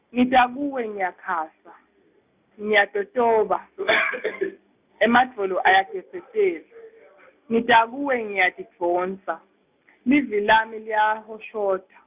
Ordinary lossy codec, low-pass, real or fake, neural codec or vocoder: Opus, 64 kbps; 3.6 kHz; real; none